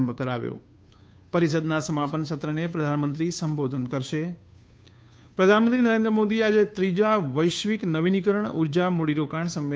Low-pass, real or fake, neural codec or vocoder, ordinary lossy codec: none; fake; codec, 16 kHz, 2 kbps, FunCodec, trained on Chinese and English, 25 frames a second; none